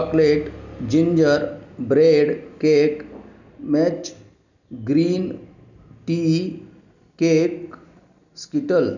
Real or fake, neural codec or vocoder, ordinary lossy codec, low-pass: real; none; none; 7.2 kHz